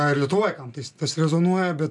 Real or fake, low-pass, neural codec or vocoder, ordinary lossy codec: real; 10.8 kHz; none; MP3, 96 kbps